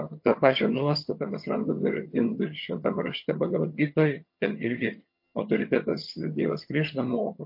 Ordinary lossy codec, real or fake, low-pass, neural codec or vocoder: MP3, 32 kbps; fake; 5.4 kHz; vocoder, 22.05 kHz, 80 mel bands, HiFi-GAN